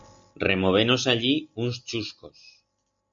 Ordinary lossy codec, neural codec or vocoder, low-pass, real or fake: MP3, 48 kbps; none; 7.2 kHz; real